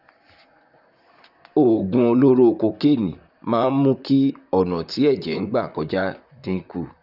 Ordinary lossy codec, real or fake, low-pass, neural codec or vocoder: none; fake; 5.4 kHz; vocoder, 44.1 kHz, 128 mel bands, Pupu-Vocoder